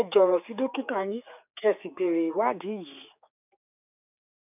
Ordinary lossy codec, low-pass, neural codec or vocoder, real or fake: none; 3.6 kHz; codec, 16 kHz, 4 kbps, X-Codec, HuBERT features, trained on general audio; fake